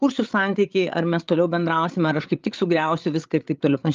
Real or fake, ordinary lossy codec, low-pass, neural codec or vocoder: fake; Opus, 32 kbps; 7.2 kHz; codec, 16 kHz, 8 kbps, FreqCodec, larger model